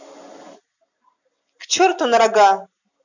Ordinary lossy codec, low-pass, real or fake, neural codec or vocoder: none; 7.2 kHz; real; none